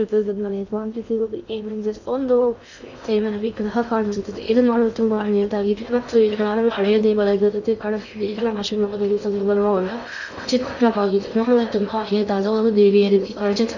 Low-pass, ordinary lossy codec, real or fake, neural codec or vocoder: 7.2 kHz; none; fake; codec, 16 kHz in and 24 kHz out, 0.6 kbps, FocalCodec, streaming, 2048 codes